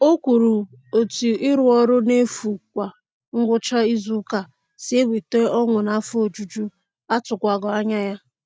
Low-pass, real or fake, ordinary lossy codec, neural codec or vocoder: none; real; none; none